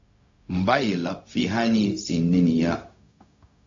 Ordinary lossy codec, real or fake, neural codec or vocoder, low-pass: AAC, 48 kbps; fake; codec, 16 kHz, 0.4 kbps, LongCat-Audio-Codec; 7.2 kHz